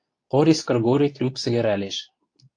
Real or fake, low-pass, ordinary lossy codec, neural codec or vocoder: fake; 9.9 kHz; AAC, 48 kbps; codec, 24 kHz, 0.9 kbps, WavTokenizer, medium speech release version 2